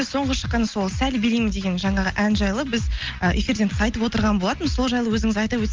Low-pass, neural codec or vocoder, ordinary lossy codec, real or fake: 7.2 kHz; none; Opus, 32 kbps; real